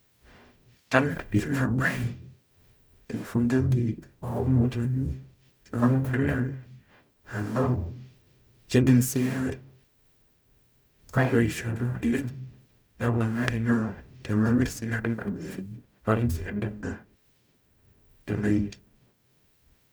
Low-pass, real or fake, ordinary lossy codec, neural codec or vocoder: none; fake; none; codec, 44.1 kHz, 0.9 kbps, DAC